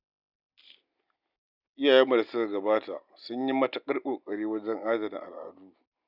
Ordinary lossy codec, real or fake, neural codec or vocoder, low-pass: none; real; none; 5.4 kHz